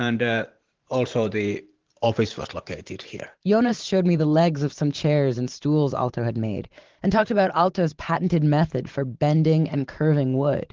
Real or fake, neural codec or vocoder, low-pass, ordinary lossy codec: fake; vocoder, 44.1 kHz, 128 mel bands every 512 samples, BigVGAN v2; 7.2 kHz; Opus, 16 kbps